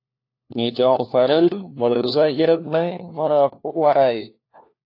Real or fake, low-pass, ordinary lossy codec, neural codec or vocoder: fake; 5.4 kHz; AAC, 32 kbps; codec, 16 kHz, 1 kbps, FunCodec, trained on LibriTTS, 50 frames a second